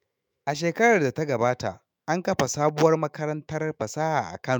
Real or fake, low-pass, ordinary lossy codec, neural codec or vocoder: fake; 19.8 kHz; MP3, 96 kbps; autoencoder, 48 kHz, 128 numbers a frame, DAC-VAE, trained on Japanese speech